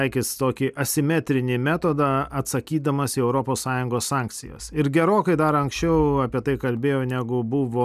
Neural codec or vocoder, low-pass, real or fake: none; 14.4 kHz; real